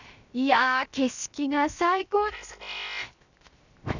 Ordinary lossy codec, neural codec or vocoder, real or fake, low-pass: none; codec, 16 kHz, 0.7 kbps, FocalCodec; fake; 7.2 kHz